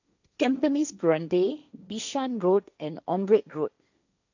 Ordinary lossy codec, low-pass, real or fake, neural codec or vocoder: none; 7.2 kHz; fake; codec, 16 kHz, 1.1 kbps, Voila-Tokenizer